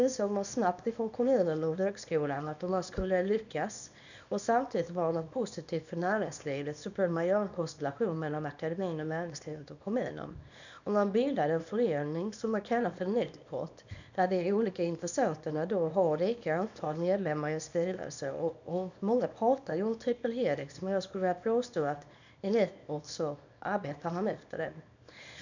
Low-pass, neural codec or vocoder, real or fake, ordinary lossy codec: 7.2 kHz; codec, 24 kHz, 0.9 kbps, WavTokenizer, small release; fake; none